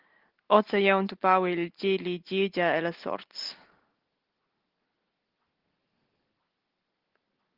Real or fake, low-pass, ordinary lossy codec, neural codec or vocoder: real; 5.4 kHz; Opus, 16 kbps; none